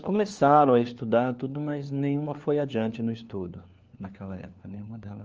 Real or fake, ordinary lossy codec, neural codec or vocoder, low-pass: fake; Opus, 24 kbps; codec, 16 kHz, 4 kbps, FunCodec, trained on LibriTTS, 50 frames a second; 7.2 kHz